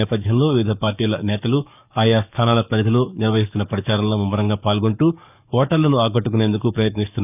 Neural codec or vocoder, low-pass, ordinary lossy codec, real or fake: codec, 44.1 kHz, 7.8 kbps, DAC; 3.6 kHz; none; fake